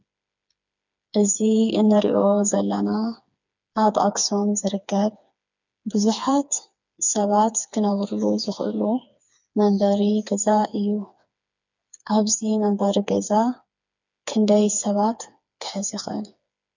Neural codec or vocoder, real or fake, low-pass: codec, 16 kHz, 4 kbps, FreqCodec, smaller model; fake; 7.2 kHz